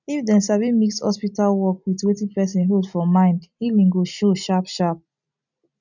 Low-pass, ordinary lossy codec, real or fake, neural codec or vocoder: 7.2 kHz; none; real; none